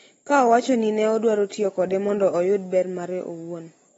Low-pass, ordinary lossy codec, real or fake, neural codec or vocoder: 19.8 kHz; AAC, 24 kbps; real; none